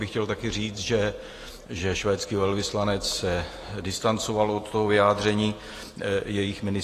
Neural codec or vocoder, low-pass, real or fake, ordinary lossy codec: vocoder, 48 kHz, 128 mel bands, Vocos; 14.4 kHz; fake; AAC, 48 kbps